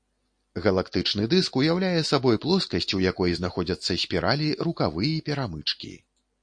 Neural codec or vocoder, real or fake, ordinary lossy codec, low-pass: none; real; MP3, 48 kbps; 9.9 kHz